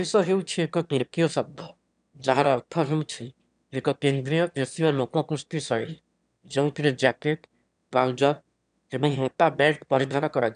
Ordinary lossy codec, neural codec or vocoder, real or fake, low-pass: none; autoencoder, 22.05 kHz, a latent of 192 numbers a frame, VITS, trained on one speaker; fake; 9.9 kHz